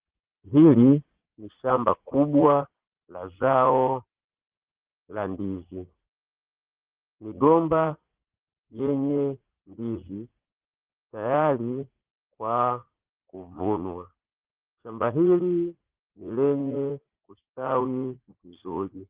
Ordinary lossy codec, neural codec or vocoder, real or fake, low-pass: Opus, 16 kbps; vocoder, 44.1 kHz, 80 mel bands, Vocos; fake; 3.6 kHz